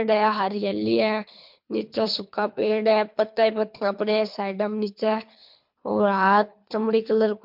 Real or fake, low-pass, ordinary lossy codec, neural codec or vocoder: fake; 5.4 kHz; MP3, 48 kbps; codec, 24 kHz, 3 kbps, HILCodec